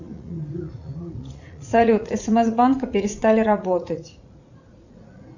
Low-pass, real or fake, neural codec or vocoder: 7.2 kHz; fake; vocoder, 44.1 kHz, 80 mel bands, Vocos